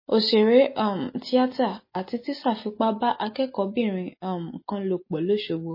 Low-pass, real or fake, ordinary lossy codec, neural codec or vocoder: 5.4 kHz; real; MP3, 24 kbps; none